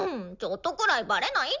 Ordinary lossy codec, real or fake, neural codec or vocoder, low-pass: none; real; none; 7.2 kHz